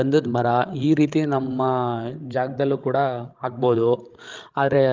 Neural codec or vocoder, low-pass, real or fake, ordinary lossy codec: codec, 16 kHz, 16 kbps, FreqCodec, larger model; 7.2 kHz; fake; Opus, 32 kbps